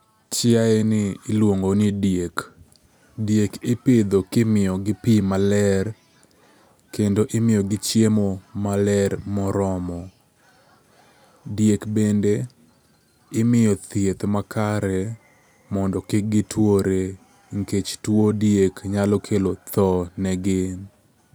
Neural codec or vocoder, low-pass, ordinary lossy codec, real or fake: none; none; none; real